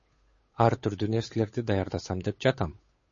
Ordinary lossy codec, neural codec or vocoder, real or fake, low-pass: MP3, 32 kbps; codec, 16 kHz, 8 kbps, FunCodec, trained on Chinese and English, 25 frames a second; fake; 7.2 kHz